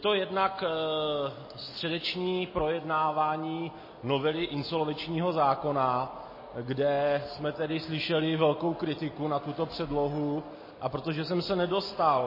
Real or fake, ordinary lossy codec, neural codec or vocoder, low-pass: real; MP3, 24 kbps; none; 5.4 kHz